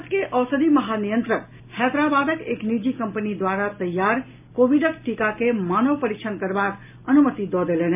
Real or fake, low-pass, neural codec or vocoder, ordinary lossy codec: real; 3.6 kHz; none; MP3, 32 kbps